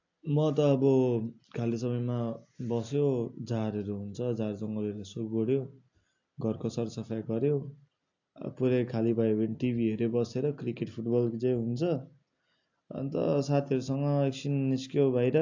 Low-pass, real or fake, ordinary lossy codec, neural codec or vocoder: 7.2 kHz; real; AAC, 48 kbps; none